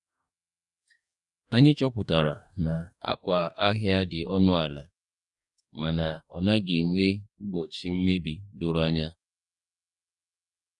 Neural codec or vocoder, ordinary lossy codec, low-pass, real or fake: codec, 44.1 kHz, 2.6 kbps, DAC; none; 10.8 kHz; fake